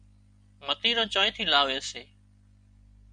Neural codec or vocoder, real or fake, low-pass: none; real; 9.9 kHz